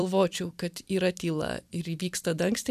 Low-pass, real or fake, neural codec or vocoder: 14.4 kHz; fake; vocoder, 44.1 kHz, 128 mel bands every 256 samples, BigVGAN v2